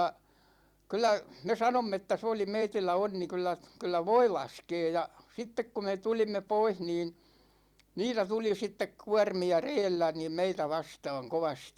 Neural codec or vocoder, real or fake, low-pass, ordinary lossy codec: vocoder, 44.1 kHz, 128 mel bands every 256 samples, BigVGAN v2; fake; 19.8 kHz; Opus, 64 kbps